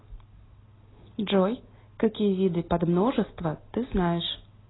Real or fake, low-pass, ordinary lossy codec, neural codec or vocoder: real; 7.2 kHz; AAC, 16 kbps; none